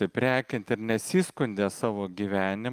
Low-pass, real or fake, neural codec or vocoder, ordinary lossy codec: 14.4 kHz; real; none; Opus, 32 kbps